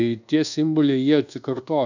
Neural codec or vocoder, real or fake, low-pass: codec, 24 kHz, 1.2 kbps, DualCodec; fake; 7.2 kHz